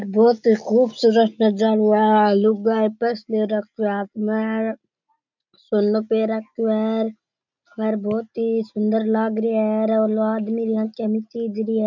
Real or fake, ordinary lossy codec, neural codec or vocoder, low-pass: real; none; none; 7.2 kHz